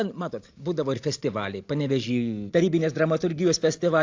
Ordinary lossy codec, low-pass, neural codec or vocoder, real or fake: AAC, 48 kbps; 7.2 kHz; none; real